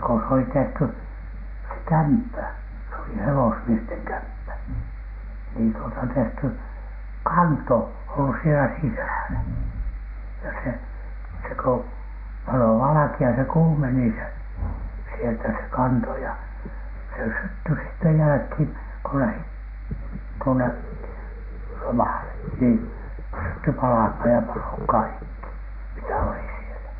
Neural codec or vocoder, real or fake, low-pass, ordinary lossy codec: none; real; 5.4 kHz; none